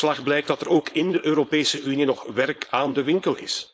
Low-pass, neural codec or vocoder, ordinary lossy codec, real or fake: none; codec, 16 kHz, 4.8 kbps, FACodec; none; fake